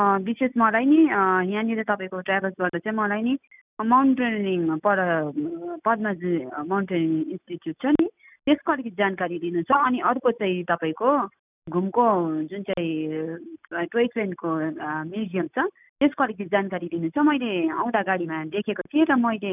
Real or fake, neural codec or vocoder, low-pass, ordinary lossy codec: real; none; 3.6 kHz; none